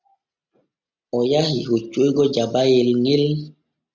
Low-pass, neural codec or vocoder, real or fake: 7.2 kHz; none; real